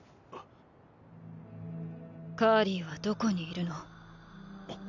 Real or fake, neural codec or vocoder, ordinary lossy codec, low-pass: real; none; none; 7.2 kHz